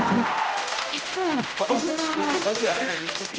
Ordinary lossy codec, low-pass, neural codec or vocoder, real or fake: none; none; codec, 16 kHz, 0.5 kbps, X-Codec, HuBERT features, trained on general audio; fake